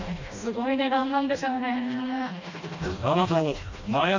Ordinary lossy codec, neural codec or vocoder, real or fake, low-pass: MP3, 48 kbps; codec, 16 kHz, 1 kbps, FreqCodec, smaller model; fake; 7.2 kHz